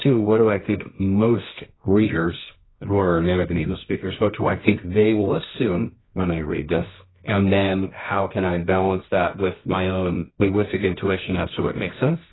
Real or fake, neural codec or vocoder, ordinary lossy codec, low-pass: fake; codec, 24 kHz, 0.9 kbps, WavTokenizer, medium music audio release; AAC, 16 kbps; 7.2 kHz